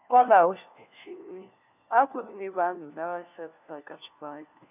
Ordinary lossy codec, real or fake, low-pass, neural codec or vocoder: none; fake; 3.6 kHz; codec, 16 kHz, 1 kbps, FunCodec, trained on LibriTTS, 50 frames a second